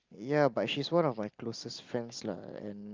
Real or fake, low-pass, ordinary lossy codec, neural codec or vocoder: real; 7.2 kHz; Opus, 24 kbps; none